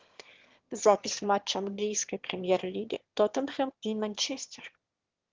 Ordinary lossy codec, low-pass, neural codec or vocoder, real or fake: Opus, 16 kbps; 7.2 kHz; autoencoder, 22.05 kHz, a latent of 192 numbers a frame, VITS, trained on one speaker; fake